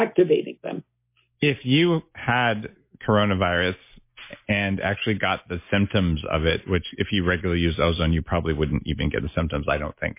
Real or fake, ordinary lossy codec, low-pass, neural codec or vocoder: real; MP3, 24 kbps; 3.6 kHz; none